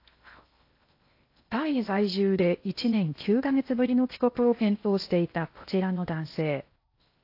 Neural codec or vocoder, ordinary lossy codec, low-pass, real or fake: codec, 16 kHz in and 24 kHz out, 0.8 kbps, FocalCodec, streaming, 65536 codes; AAC, 32 kbps; 5.4 kHz; fake